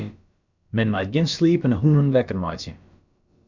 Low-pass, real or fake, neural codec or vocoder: 7.2 kHz; fake; codec, 16 kHz, about 1 kbps, DyCAST, with the encoder's durations